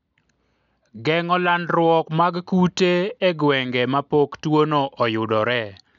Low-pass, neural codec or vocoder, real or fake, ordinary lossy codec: 7.2 kHz; none; real; none